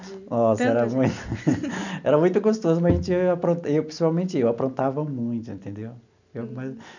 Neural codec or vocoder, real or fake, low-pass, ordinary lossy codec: none; real; 7.2 kHz; none